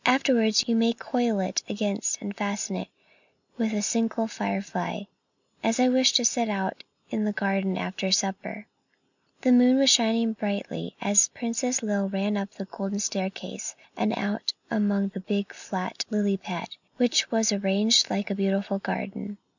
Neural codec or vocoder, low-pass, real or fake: none; 7.2 kHz; real